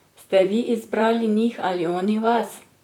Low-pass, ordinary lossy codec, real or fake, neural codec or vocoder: 19.8 kHz; none; fake; vocoder, 44.1 kHz, 128 mel bands, Pupu-Vocoder